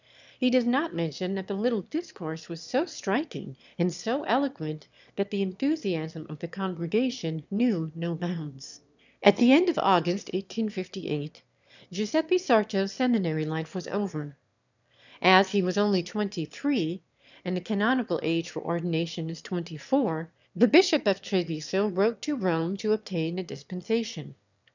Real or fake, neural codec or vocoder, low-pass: fake; autoencoder, 22.05 kHz, a latent of 192 numbers a frame, VITS, trained on one speaker; 7.2 kHz